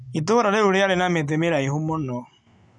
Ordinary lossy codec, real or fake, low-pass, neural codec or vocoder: none; real; 10.8 kHz; none